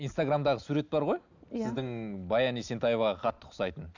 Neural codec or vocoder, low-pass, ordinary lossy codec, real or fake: none; 7.2 kHz; none; real